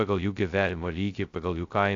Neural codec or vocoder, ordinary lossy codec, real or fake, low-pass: codec, 16 kHz, 0.2 kbps, FocalCodec; AAC, 48 kbps; fake; 7.2 kHz